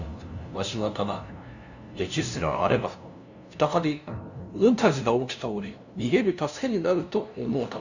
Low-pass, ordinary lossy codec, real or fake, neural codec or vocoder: 7.2 kHz; none; fake; codec, 16 kHz, 0.5 kbps, FunCodec, trained on LibriTTS, 25 frames a second